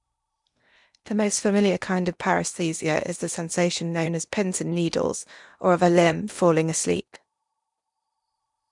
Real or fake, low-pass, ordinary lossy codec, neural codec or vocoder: fake; 10.8 kHz; none; codec, 16 kHz in and 24 kHz out, 0.8 kbps, FocalCodec, streaming, 65536 codes